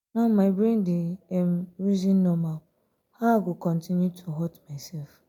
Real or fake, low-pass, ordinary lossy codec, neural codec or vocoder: real; 19.8 kHz; MP3, 96 kbps; none